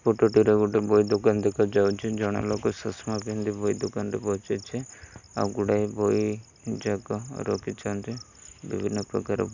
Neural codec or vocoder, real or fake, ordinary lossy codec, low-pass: none; real; none; 7.2 kHz